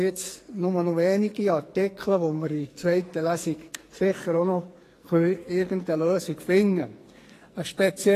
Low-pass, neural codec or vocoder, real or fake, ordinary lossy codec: 14.4 kHz; codec, 44.1 kHz, 2.6 kbps, SNAC; fake; AAC, 48 kbps